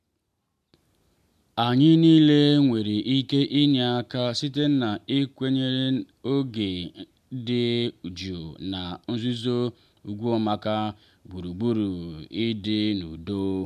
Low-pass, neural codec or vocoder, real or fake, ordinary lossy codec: 14.4 kHz; none; real; MP3, 96 kbps